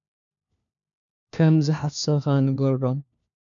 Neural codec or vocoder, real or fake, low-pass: codec, 16 kHz, 1 kbps, FunCodec, trained on LibriTTS, 50 frames a second; fake; 7.2 kHz